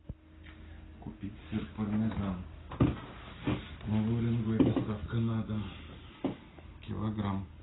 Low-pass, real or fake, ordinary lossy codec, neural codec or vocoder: 7.2 kHz; real; AAC, 16 kbps; none